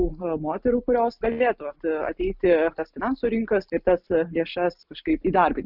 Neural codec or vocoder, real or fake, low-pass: none; real; 5.4 kHz